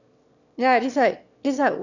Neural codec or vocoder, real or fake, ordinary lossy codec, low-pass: autoencoder, 22.05 kHz, a latent of 192 numbers a frame, VITS, trained on one speaker; fake; none; 7.2 kHz